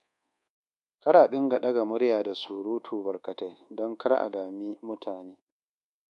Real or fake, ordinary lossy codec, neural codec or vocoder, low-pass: fake; MP3, 64 kbps; codec, 24 kHz, 1.2 kbps, DualCodec; 10.8 kHz